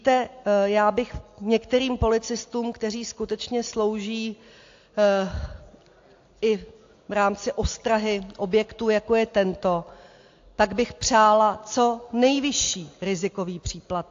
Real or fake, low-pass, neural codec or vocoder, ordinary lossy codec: real; 7.2 kHz; none; AAC, 48 kbps